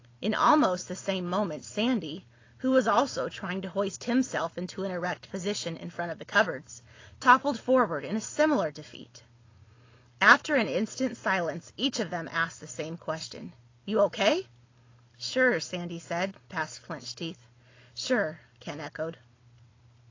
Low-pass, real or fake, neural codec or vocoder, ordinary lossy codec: 7.2 kHz; real; none; AAC, 32 kbps